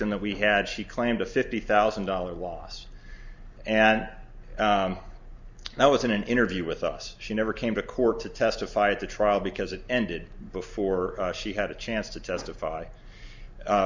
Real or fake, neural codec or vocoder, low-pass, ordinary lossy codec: real; none; 7.2 kHz; Opus, 64 kbps